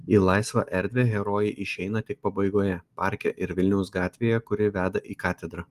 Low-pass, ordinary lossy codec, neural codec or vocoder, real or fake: 14.4 kHz; Opus, 24 kbps; none; real